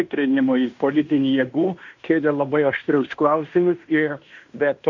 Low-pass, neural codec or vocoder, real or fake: 7.2 kHz; codec, 16 kHz in and 24 kHz out, 0.9 kbps, LongCat-Audio-Codec, fine tuned four codebook decoder; fake